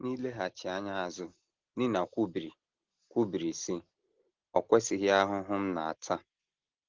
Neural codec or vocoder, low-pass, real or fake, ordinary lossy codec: none; 7.2 kHz; real; Opus, 16 kbps